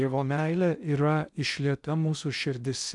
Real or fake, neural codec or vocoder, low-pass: fake; codec, 16 kHz in and 24 kHz out, 0.6 kbps, FocalCodec, streaming, 2048 codes; 10.8 kHz